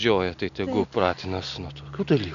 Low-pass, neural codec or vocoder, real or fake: 7.2 kHz; none; real